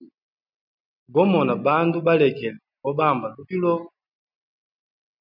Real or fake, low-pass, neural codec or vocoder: real; 5.4 kHz; none